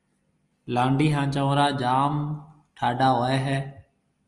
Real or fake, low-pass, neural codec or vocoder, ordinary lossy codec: real; 10.8 kHz; none; Opus, 32 kbps